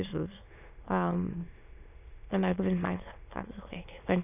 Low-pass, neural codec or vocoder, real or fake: 3.6 kHz; autoencoder, 22.05 kHz, a latent of 192 numbers a frame, VITS, trained on many speakers; fake